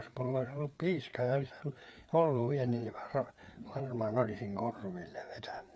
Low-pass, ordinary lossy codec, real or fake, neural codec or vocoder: none; none; fake; codec, 16 kHz, 4 kbps, FreqCodec, larger model